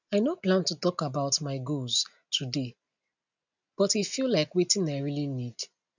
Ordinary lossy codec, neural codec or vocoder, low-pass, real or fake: none; none; 7.2 kHz; real